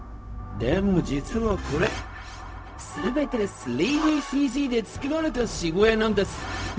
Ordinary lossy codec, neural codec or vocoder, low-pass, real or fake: none; codec, 16 kHz, 0.4 kbps, LongCat-Audio-Codec; none; fake